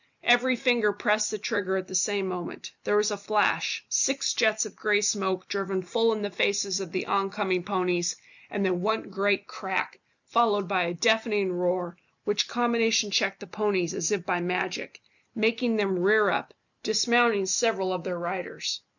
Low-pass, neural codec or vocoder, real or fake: 7.2 kHz; none; real